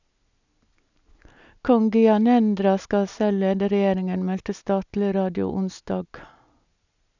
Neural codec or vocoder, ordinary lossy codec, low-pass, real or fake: none; none; 7.2 kHz; real